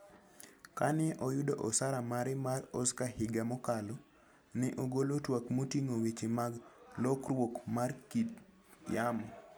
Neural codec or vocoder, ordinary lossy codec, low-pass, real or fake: none; none; none; real